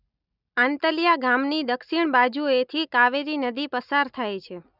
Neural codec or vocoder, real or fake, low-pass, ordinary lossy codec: none; real; 5.4 kHz; none